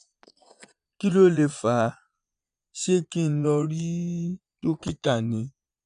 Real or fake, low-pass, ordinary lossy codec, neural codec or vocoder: fake; 9.9 kHz; none; vocoder, 22.05 kHz, 80 mel bands, Vocos